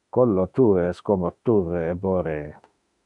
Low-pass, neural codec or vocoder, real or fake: 10.8 kHz; autoencoder, 48 kHz, 32 numbers a frame, DAC-VAE, trained on Japanese speech; fake